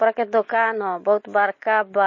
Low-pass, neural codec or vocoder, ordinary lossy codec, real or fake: 7.2 kHz; none; MP3, 32 kbps; real